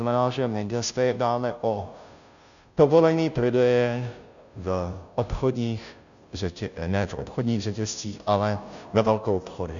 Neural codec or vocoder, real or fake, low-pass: codec, 16 kHz, 0.5 kbps, FunCodec, trained on Chinese and English, 25 frames a second; fake; 7.2 kHz